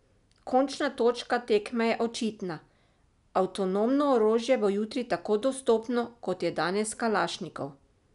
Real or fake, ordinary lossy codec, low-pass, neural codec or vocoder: real; none; 10.8 kHz; none